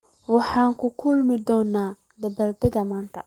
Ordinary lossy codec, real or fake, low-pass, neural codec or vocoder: Opus, 32 kbps; fake; 19.8 kHz; codec, 44.1 kHz, 7.8 kbps, Pupu-Codec